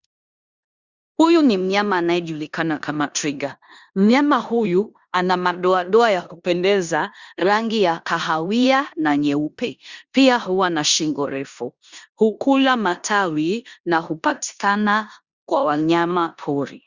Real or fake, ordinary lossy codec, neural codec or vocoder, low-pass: fake; Opus, 64 kbps; codec, 16 kHz in and 24 kHz out, 0.9 kbps, LongCat-Audio-Codec, four codebook decoder; 7.2 kHz